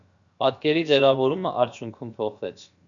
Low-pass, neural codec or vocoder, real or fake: 7.2 kHz; codec, 16 kHz, about 1 kbps, DyCAST, with the encoder's durations; fake